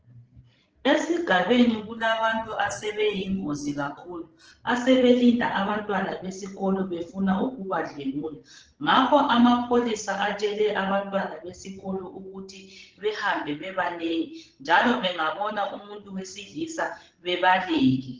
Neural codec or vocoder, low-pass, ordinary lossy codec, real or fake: codec, 16 kHz, 16 kbps, FreqCodec, larger model; 7.2 kHz; Opus, 16 kbps; fake